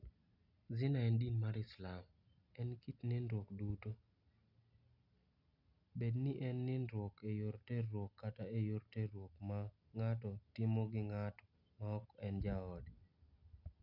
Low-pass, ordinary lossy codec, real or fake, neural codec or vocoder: 5.4 kHz; none; real; none